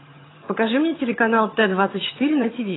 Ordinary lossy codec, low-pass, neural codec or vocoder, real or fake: AAC, 16 kbps; 7.2 kHz; vocoder, 22.05 kHz, 80 mel bands, HiFi-GAN; fake